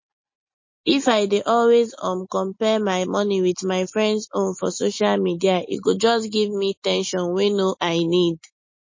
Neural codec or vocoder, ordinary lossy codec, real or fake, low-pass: none; MP3, 32 kbps; real; 7.2 kHz